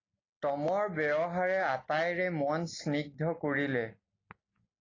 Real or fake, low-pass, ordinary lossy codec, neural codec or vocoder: real; 7.2 kHz; AAC, 32 kbps; none